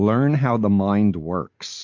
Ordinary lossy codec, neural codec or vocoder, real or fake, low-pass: MP3, 48 kbps; none; real; 7.2 kHz